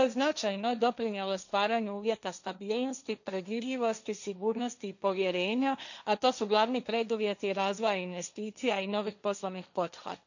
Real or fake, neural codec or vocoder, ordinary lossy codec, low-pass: fake; codec, 16 kHz, 1.1 kbps, Voila-Tokenizer; none; 7.2 kHz